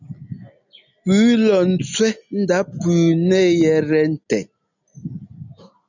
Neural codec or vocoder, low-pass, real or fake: none; 7.2 kHz; real